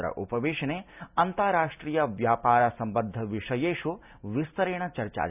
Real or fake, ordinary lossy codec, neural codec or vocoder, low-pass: real; none; none; 3.6 kHz